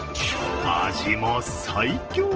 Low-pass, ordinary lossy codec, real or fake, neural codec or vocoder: 7.2 kHz; Opus, 16 kbps; real; none